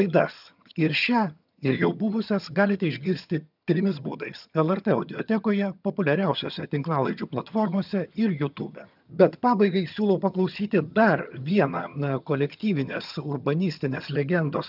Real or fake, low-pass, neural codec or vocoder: fake; 5.4 kHz; vocoder, 22.05 kHz, 80 mel bands, HiFi-GAN